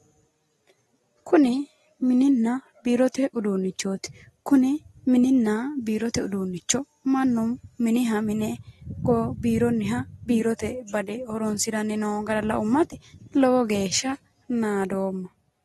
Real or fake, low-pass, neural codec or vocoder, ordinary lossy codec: real; 19.8 kHz; none; AAC, 32 kbps